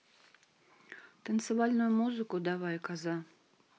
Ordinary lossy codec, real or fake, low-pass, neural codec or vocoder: none; real; none; none